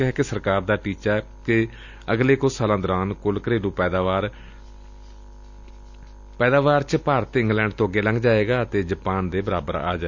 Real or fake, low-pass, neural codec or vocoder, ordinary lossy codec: real; 7.2 kHz; none; none